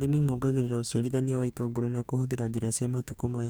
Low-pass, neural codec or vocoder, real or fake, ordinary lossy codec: none; codec, 44.1 kHz, 2.6 kbps, DAC; fake; none